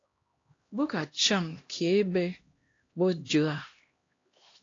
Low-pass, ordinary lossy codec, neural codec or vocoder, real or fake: 7.2 kHz; AAC, 32 kbps; codec, 16 kHz, 1 kbps, X-Codec, HuBERT features, trained on LibriSpeech; fake